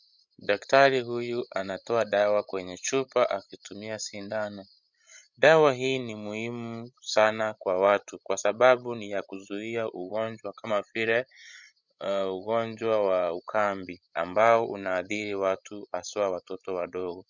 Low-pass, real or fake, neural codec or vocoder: 7.2 kHz; fake; codec, 16 kHz, 16 kbps, FreqCodec, larger model